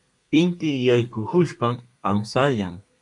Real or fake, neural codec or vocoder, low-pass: fake; codec, 32 kHz, 1.9 kbps, SNAC; 10.8 kHz